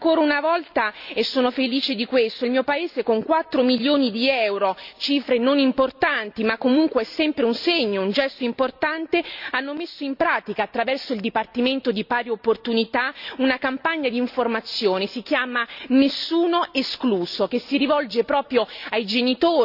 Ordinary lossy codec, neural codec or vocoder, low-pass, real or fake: none; none; 5.4 kHz; real